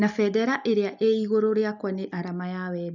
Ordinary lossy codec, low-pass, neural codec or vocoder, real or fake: none; 7.2 kHz; none; real